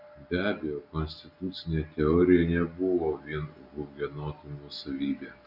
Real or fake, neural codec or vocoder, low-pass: real; none; 5.4 kHz